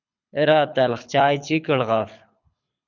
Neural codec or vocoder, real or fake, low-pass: codec, 24 kHz, 6 kbps, HILCodec; fake; 7.2 kHz